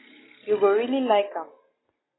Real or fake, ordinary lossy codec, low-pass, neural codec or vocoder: real; AAC, 16 kbps; 7.2 kHz; none